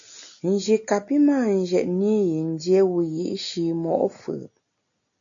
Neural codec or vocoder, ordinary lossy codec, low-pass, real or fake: none; AAC, 64 kbps; 7.2 kHz; real